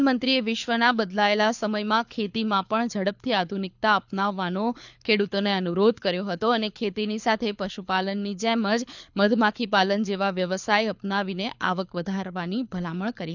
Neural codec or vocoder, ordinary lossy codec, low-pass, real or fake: codec, 24 kHz, 6 kbps, HILCodec; none; 7.2 kHz; fake